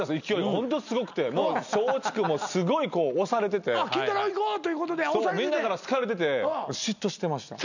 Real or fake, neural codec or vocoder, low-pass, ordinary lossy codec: real; none; 7.2 kHz; none